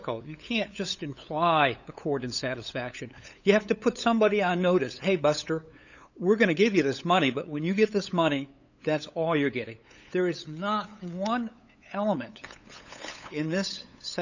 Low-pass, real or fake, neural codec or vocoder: 7.2 kHz; fake; codec, 16 kHz, 8 kbps, FunCodec, trained on LibriTTS, 25 frames a second